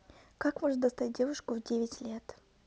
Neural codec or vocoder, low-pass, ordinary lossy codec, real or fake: none; none; none; real